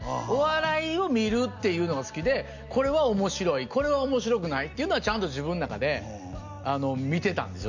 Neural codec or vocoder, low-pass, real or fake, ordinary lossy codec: none; 7.2 kHz; real; none